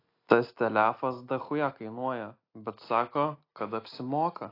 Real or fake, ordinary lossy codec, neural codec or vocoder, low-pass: real; AAC, 32 kbps; none; 5.4 kHz